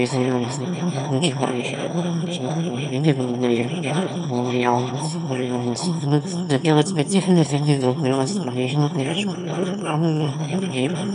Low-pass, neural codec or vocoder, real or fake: 9.9 kHz; autoencoder, 22.05 kHz, a latent of 192 numbers a frame, VITS, trained on one speaker; fake